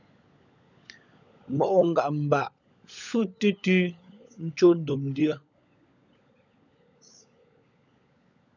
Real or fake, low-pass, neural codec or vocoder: fake; 7.2 kHz; codec, 16 kHz, 16 kbps, FunCodec, trained on LibriTTS, 50 frames a second